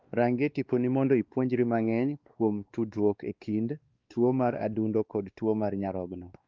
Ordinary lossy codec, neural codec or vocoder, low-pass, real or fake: Opus, 32 kbps; codec, 16 kHz, 2 kbps, X-Codec, WavLM features, trained on Multilingual LibriSpeech; 7.2 kHz; fake